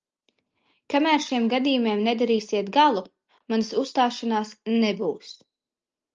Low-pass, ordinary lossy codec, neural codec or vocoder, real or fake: 7.2 kHz; Opus, 24 kbps; none; real